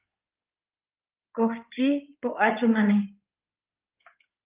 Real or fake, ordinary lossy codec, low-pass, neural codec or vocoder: fake; Opus, 32 kbps; 3.6 kHz; codec, 16 kHz in and 24 kHz out, 2.2 kbps, FireRedTTS-2 codec